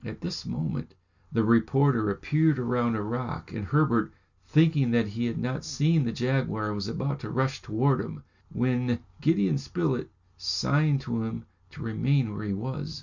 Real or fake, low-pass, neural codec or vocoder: real; 7.2 kHz; none